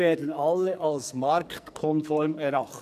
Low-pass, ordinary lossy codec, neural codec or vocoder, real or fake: 14.4 kHz; none; codec, 44.1 kHz, 2.6 kbps, SNAC; fake